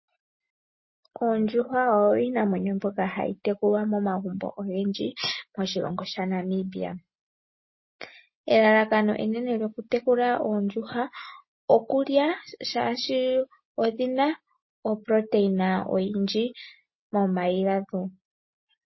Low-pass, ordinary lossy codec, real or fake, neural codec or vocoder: 7.2 kHz; MP3, 24 kbps; real; none